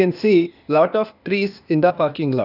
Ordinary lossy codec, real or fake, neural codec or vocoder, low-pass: none; fake; codec, 16 kHz, 0.8 kbps, ZipCodec; 5.4 kHz